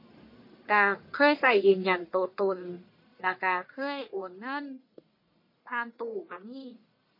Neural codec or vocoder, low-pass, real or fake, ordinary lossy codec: codec, 44.1 kHz, 1.7 kbps, Pupu-Codec; 5.4 kHz; fake; MP3, 32 kbps